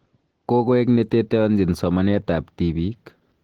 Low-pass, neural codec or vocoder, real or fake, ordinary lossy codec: 19.8 kHz; none; real; Opus, 16 kbps